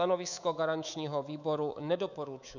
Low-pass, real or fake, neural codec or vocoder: 7.2 kHz; fake; codec, 24 kHz, 3.1 kbps, DualCodec